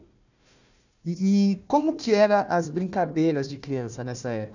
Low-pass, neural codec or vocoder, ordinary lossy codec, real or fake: 7.2 kHz; codec, 16 kHz, 1 kbps, FunCodec, trained on Chinese and English, 50 frames a second; none; fake